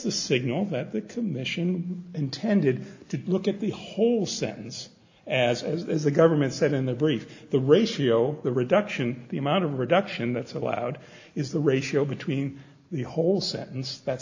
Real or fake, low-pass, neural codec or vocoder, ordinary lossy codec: real; 7.2 kHz; none; AAC, 48 kbps